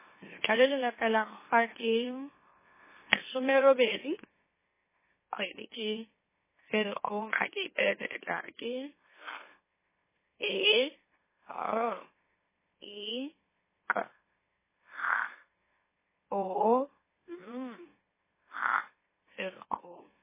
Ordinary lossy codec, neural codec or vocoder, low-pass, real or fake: MP3, 16 kbps; autoencoder, 44.1 kHz, a latent of 192 numbers a frame, MeloTTS; 3.6 kHz; fake